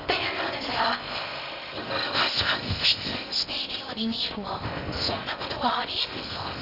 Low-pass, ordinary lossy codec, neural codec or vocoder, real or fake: 5.4 kHz; none; codec, 16 kHz in and 24 kHz out, 0.6 kbps, FocalCodec, streaming, 4096 codes; fake